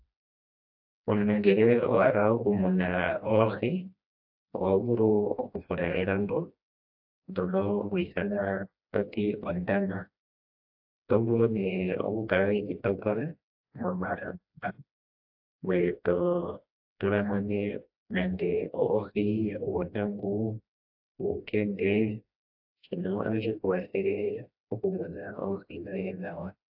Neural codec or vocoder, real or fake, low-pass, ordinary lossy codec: codec, 16 kHz, 1 kbps, FreqCodec, smaller model; fake; 5.4 kHz; AAC, 48 kbps